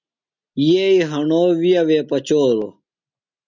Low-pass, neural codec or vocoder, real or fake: 7.2 kHz; none; real